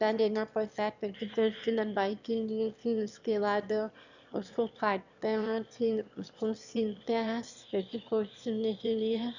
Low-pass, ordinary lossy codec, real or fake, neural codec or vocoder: 7.2 kHz; none; fake; autoencoder, 22.05 kHz, a latent of 192 numbers a frame, VITS, trained on one speaker